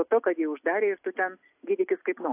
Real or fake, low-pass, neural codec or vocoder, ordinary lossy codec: real; 3.6 kHz; none; AAC, 24 kbps